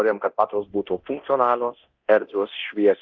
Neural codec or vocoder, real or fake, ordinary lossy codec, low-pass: codec, 24 kHz, 0.9 kbps, DualCodec; fake; Opus, 24 kbps; 7.2 kHz